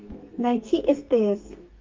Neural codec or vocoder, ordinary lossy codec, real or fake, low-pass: codec, 32 kHz, 1.9 kbps, SNAC; Opus, 24 kbps; fake; 7.2 kHz